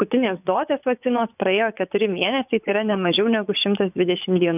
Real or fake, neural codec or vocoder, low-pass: fake; vocoder, 24 kHz, 100 mel bands, Vocos; 3.6 kHz